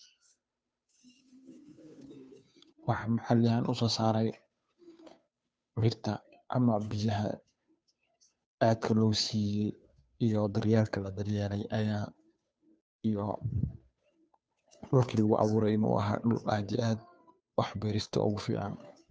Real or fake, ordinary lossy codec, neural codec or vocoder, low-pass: fake; none; codec, 16 kHz, 2 kbps, FunCodec, trained on Chinese and English, 25 frames a second; none